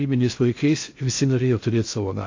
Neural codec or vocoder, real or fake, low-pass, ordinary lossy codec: codec, 16 kHz in and 24 kHz out, 0.6 kbps, FocalCodec, streaming, 2048 codes; fake; 7.2 kHz; AAC, 48 kbps